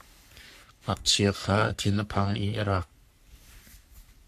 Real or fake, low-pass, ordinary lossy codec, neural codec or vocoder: fake; 14.4 kHz; MP3, 96 kbps; codec, 44.1 kHz, 3.4 kbps, Pupu-Codec